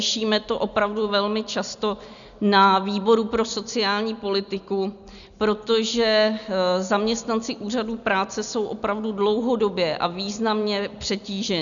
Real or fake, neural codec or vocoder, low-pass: real; none; 7.2 kHz